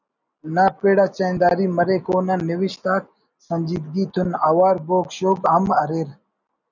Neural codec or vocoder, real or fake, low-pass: none; real; 7.2 kHz